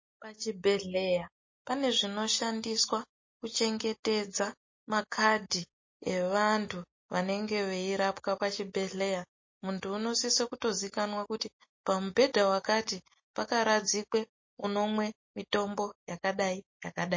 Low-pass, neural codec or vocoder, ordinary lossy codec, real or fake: 7.2 kHz; none; MP3, 32 kbps; real